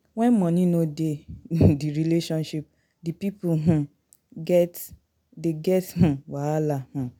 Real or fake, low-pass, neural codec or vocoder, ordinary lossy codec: real; 19.8 kHz; none; none